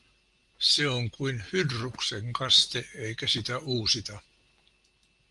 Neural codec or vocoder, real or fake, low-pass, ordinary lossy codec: none; real; 10.8 kHz; Opus, 32 kbps